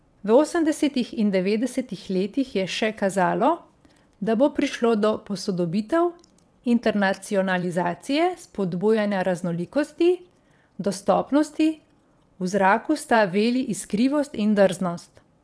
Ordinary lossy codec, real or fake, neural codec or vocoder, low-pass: none; fake; vocoder, 22.05 kHz, 80 mel bands, WaveNeXt; none